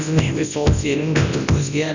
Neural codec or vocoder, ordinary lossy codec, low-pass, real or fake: codec, 24 kHz, 0.9 kbps, WavTokenizer, large speech release; none; 7.2 kHz; fake